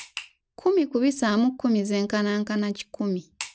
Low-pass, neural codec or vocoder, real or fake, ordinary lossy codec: none; none; real; none